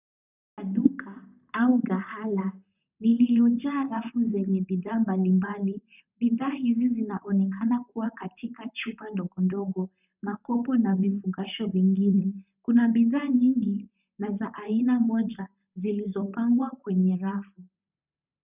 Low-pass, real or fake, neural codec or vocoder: 3.6 kHz; fake; vocoder, 44.1 kHz, 128 mel bands, Pupu-Vocoder